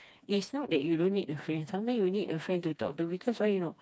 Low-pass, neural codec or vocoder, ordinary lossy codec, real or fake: none; codec, 16 kHz, 2 kbps, FreqCodec, smaller model; none; fake